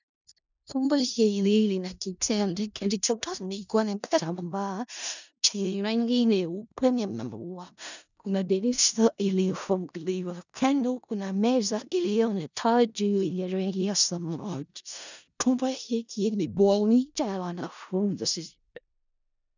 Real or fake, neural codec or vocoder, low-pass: fake; codec, 16 kHz in and 24 kHz out, 0.4 kbps, LongCat-Audio-Codec, four codebook decoder; 7.2 kHz